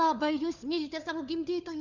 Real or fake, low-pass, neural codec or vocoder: fake; 7.2 kHz; codec, 16 kHz, 4 kbps, FunCodec, trained on LibriTTS, 50 frames a second